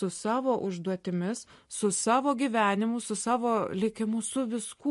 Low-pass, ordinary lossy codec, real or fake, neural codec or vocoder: 14.4 kHz; MP3, 48 kbps; real; none